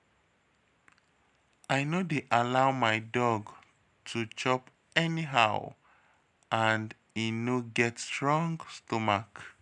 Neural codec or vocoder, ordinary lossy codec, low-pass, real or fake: none; none; 10.8 kHz; real